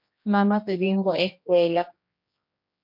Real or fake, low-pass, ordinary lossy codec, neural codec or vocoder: fake; 5.4 kHz; MP3, 32 kbps; codec, 16 kHz, 1 kbps, X-Codec, HuBERT features, trained on general audio